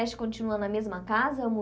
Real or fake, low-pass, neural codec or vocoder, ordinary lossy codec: real; none; none; none